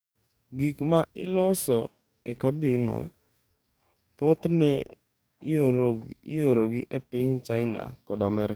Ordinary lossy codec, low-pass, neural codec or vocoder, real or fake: none; none; codec, 44.1 kHz, 2.6 kbps, DAC; fake